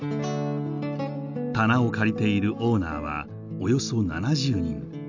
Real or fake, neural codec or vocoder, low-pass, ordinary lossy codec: real; none; 7.2 kHz; none